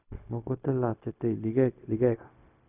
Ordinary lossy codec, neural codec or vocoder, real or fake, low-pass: none; codec, 16 kHz, 0.4 kbps, LongCat-Audio-Codec; fake; 3.6 kHz